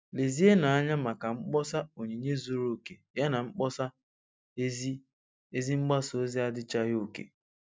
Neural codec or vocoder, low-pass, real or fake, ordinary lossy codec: none; none; real; none